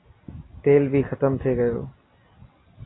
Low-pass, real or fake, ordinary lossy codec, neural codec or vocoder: 7.2 kHz; real; AAC, 16 kbps; none